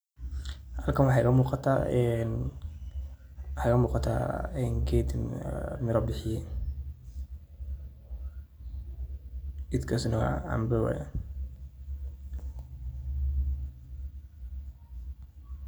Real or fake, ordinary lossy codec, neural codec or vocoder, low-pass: real; none; none; none